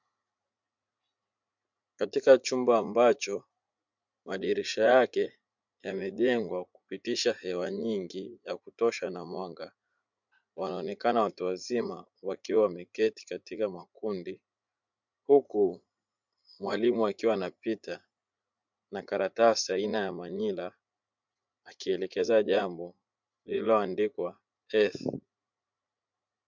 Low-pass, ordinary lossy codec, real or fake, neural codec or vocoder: 7.2 kHz; MP3, 64 kbps; fake; vocoder, 44.1 kHz, 80 mel bands, Vocos